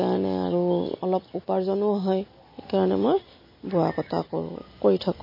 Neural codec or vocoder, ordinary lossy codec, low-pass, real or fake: none; MP3, 24 kbps; 5.4 kHz; real